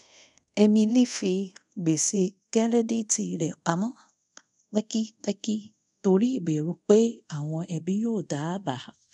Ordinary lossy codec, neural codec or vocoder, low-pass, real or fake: none; codec, 24 kHz, 0.5 kbps, DualCodec; 10.8 kHz; fake